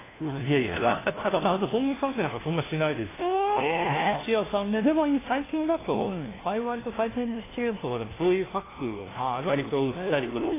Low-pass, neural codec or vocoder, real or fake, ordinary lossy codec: 3.6 kHz; codec, 16 kHz, 1 kbps, FunCodec, trained on LibriTTS, 50 frames a second; fake; AAC, 16 kbps